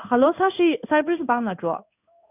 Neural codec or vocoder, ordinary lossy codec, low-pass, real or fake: codec, 16 kHz in and 24 kHz out, 1 kbps, XY-Tokenizer; none; 3.6 kHz; fake